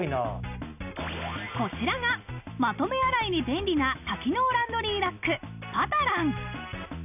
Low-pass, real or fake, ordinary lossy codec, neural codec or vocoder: 3.6 kHz; real; none; none